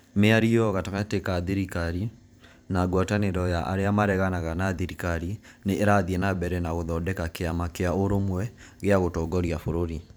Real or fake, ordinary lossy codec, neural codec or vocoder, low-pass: fake; none; vocoder, 44.1 kHz, 128 mel bands every 512 samples, BigVGAN v2; none